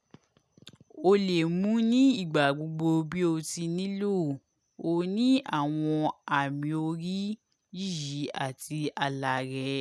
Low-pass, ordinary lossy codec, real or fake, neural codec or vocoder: none; none; real; none